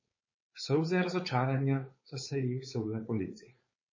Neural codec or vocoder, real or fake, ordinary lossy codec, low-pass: codec, 16 kHz, 4.8 kbps, FACodec; fake; MP3, 32 kbps; 7.2 kHz